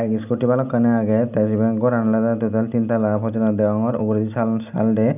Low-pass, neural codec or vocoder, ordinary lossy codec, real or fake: 3.6 kHz; none; none; real